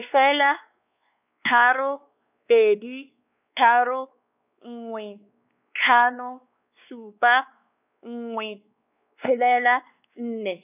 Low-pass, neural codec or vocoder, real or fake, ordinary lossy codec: 3.6 kHz; codec, 16 kHz, 2 kbps, X-Codec, WavLM features, trained on Multilingual LibriSpeech; fake; none